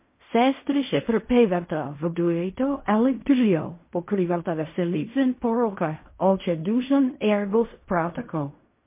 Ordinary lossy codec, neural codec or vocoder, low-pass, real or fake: MP3, 24 kbps; codec, 16 kHz in and 24 kHz out, 0.4 kbps, LongCat-Audio-Codec, fine tuned four codebook decoder; 3.6 kHz; fake